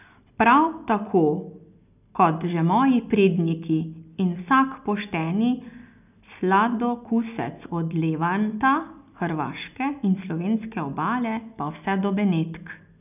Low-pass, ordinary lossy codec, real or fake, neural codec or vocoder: 3.6 kHz; none; real; none